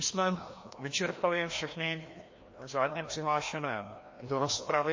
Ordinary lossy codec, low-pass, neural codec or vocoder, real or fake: MP3, 32 kbps; 7.2 kHz; codec, 16 kHz, 1 kbps, FreqCodec, larger model; fake